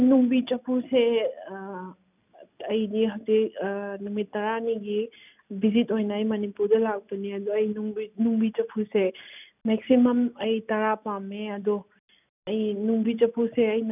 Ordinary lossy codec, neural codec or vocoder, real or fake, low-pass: none; none; real; 3.6 kHz